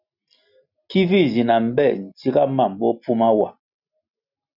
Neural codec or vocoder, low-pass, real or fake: none; 5.4 kHz; real